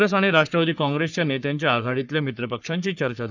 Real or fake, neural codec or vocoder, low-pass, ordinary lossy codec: fake; codec, 44.1 kHz, 3.4 kbps, Pupu-Codec; 7.2 kHz; none